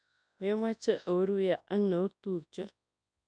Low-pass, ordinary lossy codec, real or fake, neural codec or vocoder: 9.9 kHz; none; fake; codec, 24 kHz, 0.9 kbps, WavTokenizer, large speech release